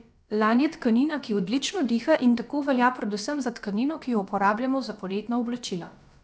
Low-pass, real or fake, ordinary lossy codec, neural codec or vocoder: none; fake; none; codec, 16 kHz, about 1 kbps, DyCAST, with the encoder's durations